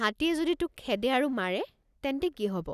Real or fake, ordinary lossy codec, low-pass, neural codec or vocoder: real; none; 14.4 kHz; none